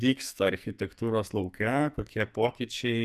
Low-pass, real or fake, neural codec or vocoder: 14.4 kHz; fake; codec, 44.1 kHz, 2.6 kbps, SNAC